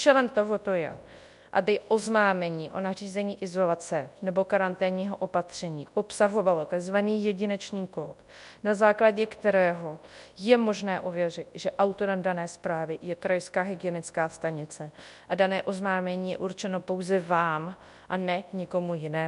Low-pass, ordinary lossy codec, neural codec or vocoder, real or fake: 10.8 kHz; MP3, 64 kbps; codec, 24 kHz, 0.9 kbps, WavTokenizer, large speech release; fake